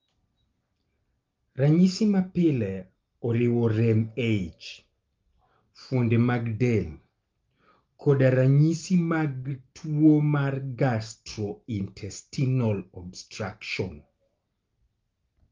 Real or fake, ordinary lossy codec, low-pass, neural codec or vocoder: real; Opus, 32 kbps; 7.2 kHz; none